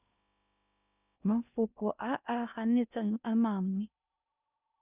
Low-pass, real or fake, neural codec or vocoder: 3.6 kHz; fake; codec, 16 kHz in and 24 kHz out, 0.6 kbps, FocalCodec, streaming, 2048 codes